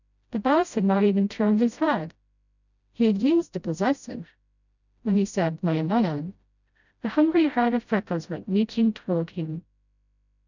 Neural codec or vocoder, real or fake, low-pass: codec, 16 kHz, 0.5 kbps, FreqCodec, smaller model; fake; 7.2 kHz